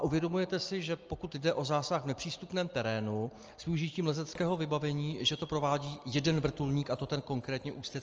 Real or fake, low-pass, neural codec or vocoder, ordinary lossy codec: real; 7.2 kHz; none; Opus, 24 kbps